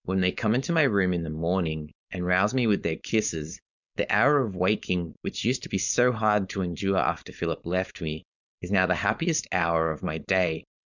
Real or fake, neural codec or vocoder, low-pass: fake; codec, 16 kHz, 4.8 kbps, FACodec; 7.2 kHz